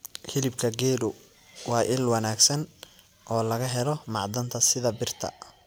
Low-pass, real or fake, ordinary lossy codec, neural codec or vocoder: none; real; none; none